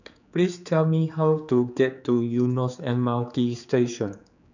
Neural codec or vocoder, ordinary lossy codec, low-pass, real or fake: codec, 16 kHz, 4 kbps, X-Codec, HuBERT features, trained on general audio; none; 7.2 kHz; fake